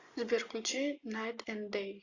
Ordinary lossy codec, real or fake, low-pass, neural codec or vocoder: AAC, 48 kbps; real; 7.2 kHz; none